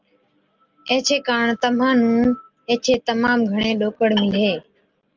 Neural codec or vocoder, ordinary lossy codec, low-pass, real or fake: none; Opus, 32 kbps; 7.2 kHz; real